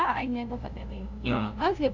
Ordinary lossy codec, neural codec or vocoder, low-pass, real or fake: none; codec, 16 kHz, 0.5 kbps, FunCodec, trained on LibriTTS, 25 frames a second; 7.2 kHz; fake